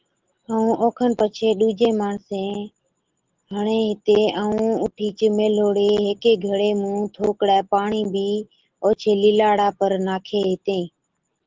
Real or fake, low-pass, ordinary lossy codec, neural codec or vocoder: real; 7.2 kHz; Opus, 16 kbps; none